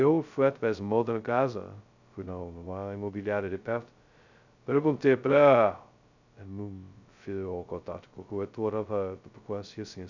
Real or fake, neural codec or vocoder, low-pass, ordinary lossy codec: fake; codec, 16 kHz, 0.2 kbps, FocalCodec; 7.2 kHz; none